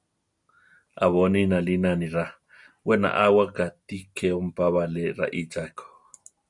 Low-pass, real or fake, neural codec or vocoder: 10.8 kHz; real; none